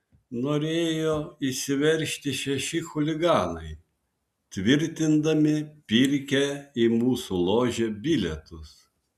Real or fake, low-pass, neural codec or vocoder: real; 14.4 kHz; none